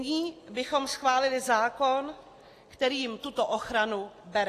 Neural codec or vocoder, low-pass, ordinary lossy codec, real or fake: none; 14.4 kHz; AAC, 48 kbps; real